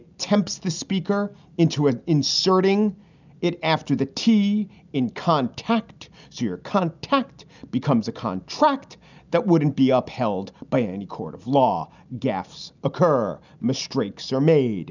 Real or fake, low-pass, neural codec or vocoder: real; 7.2 kHz; none